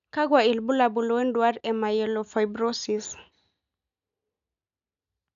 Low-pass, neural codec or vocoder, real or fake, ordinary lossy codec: 7.2 kHz; none; real; none